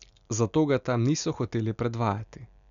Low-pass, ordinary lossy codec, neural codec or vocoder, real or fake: 7.2 kHz; none; none; real